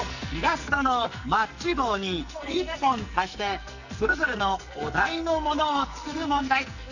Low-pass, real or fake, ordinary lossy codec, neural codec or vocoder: 7.2 kHz; fake; none; codec, 44.1 kHz, 2.6 kbps, SNAC